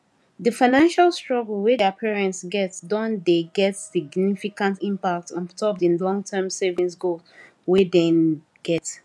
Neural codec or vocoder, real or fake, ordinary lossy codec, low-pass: vocoder, 24 kHz, 100 mel bands, Vocos; fake; none; none